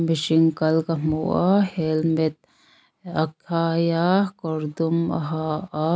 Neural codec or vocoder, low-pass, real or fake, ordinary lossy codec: none; none; real; none